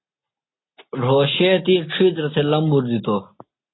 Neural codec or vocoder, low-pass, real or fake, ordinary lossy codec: none; 7.2 kHz; real; AAC, 16 kbps